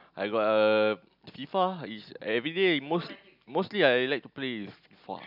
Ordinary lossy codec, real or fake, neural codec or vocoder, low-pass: none; real; none; 5.4 kHz